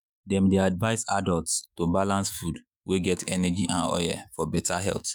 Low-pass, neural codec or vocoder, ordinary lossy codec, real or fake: none; autoencoder, 48 kHz, 128 numbers a frame, DAC-VAE, trained on Japanese speech; none; fake